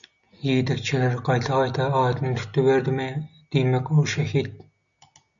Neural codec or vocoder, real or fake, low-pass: none; real; 7.2 kHz